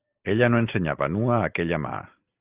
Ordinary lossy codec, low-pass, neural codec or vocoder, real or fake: Opus, 32 kbps; 3.6 kHz; none; real